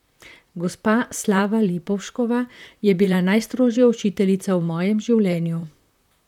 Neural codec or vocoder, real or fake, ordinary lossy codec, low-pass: vocoder, 44.1 kHz, 128 mel bands, Pupu-Vocoder; fake; none; 19.8 kHz